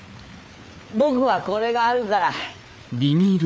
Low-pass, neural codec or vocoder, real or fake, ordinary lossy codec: none; codec, 16 kHz, 4 kbps, FreqCodec, larger model; fake; none